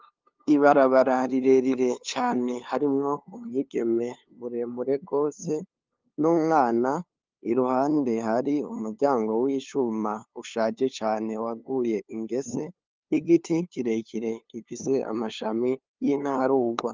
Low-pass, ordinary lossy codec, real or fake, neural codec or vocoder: 7.2 kHz; Opus, 32 kbps; fake; codec, 16 kHz, 2 kbps, FunCodec, trained on LibriTTS, 25 frames a second